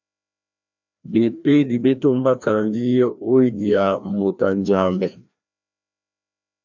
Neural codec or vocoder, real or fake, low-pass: codec, 16 kHz, 1 kbps, FreqCodec, larger model; fake; 7.2 kHz